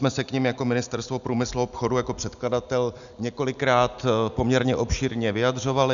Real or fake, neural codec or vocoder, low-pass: real; none; 7.2 kHz